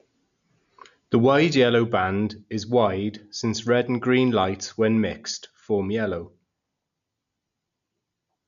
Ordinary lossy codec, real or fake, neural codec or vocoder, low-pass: none; real; none; 7.2 kHz